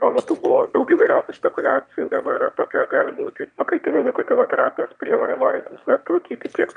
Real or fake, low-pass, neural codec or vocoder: fake; 9.9 kHz; autoencoder, 22.05 kHz, a latent of 192 numbers a frame, VITS, trained on one speaker